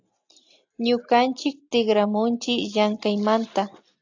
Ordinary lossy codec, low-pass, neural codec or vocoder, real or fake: AAC, 48 kbps; 7.2 kHz; none; real